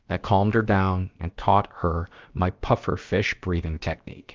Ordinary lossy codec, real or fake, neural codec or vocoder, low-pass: Opus, 32 kbps; fake; codec, 16 kHz, about 1 kbps, DyCAST, with the encoder's durations; 7.2 kHz